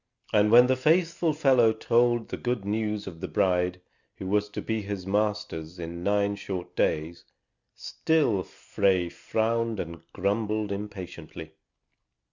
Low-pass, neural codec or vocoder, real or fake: 7.2 kHz; none; real